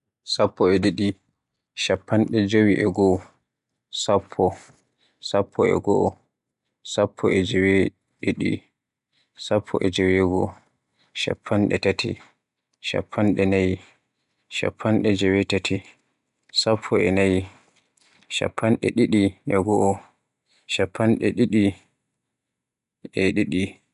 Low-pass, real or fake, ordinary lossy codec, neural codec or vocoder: 10.8 kHz; real; none; none